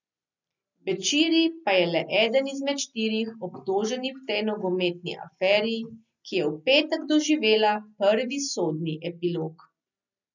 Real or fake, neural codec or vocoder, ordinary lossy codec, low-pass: real; none; none; 7.2 kHz